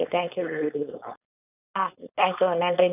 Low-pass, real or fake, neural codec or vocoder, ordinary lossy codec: 3.6 kHz; fake; codec, 16 kHz, 4.8 kbps, FACodec; AAC, 32 kbps